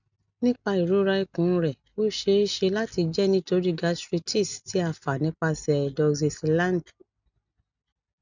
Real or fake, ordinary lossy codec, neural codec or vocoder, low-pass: real; none; none; 7.2 kHz